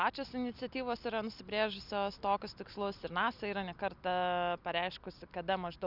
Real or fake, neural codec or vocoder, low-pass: real; none; 5.4 kHz